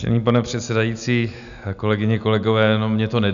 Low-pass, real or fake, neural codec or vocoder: 7.2 kHz; real; none